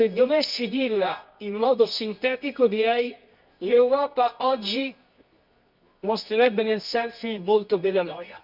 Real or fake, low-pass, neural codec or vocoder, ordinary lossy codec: fake; 5.4 kHz; codec, 24 kHz, 0.9 kbps, WavTokenizer, medium music audio release; none